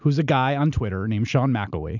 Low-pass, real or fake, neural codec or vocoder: 7.2 kHz; real; none